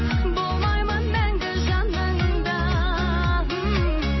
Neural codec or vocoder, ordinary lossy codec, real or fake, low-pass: none; MP3, 24 kbps; real; 7.2 kHz